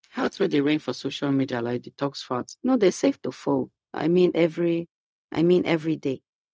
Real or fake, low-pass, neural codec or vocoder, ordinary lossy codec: fake; none; codec, 16 kHz, 0.4 kbps, LongCat-Audio-Codec; none